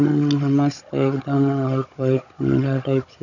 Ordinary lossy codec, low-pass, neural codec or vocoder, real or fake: none; 7.2 kHz; codec, 16 kHz, 16 kbps, FunCodec, trained on Chinese and English, 50 frames a second; fake